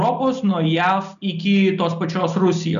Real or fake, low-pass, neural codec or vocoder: real; 7.2 kHz; none